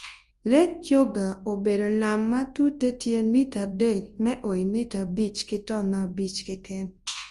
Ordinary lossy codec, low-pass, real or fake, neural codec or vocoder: Opus, 24 kbps; 10.8 kHz; fake; codec, 24 kHz, 0.9 kbps, WavTokenizer, large speech release